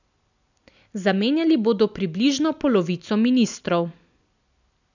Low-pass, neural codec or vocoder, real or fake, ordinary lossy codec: 7.2 kHz; none; real; none